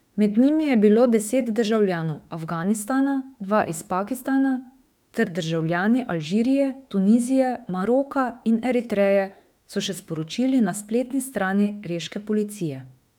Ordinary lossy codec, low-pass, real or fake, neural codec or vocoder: none; 19.8 kHz; fake; autoencoder, 48 kHz, 32 numbers a frame, DAC-VAE, trained on Japanese speech